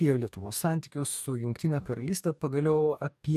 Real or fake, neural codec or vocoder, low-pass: fake; codec, 44.1 kHz, 2.6 kbps, DAC; 14.4 kHz